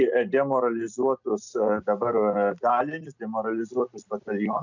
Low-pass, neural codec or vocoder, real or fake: 7.2 kHz; none; real